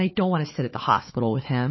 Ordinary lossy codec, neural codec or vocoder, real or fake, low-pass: MP3, 24 kbps; codec, 16 kHz, 4 kbps, FunCodec, trained on Chinese and English, 50 frames a second; fake; 7.2 kHz